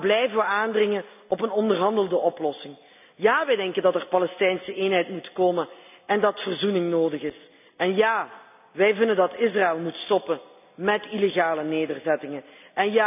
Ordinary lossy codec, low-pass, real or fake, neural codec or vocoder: none; 3.6 kHz; real; none